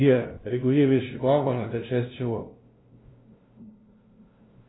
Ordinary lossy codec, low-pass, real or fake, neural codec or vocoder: AAC, 16 kbps; 7.2 kHz; fake; codec, 16 kHz, 0.5 kbps, FunCodec, trained on LibriTTS, 25 frames a second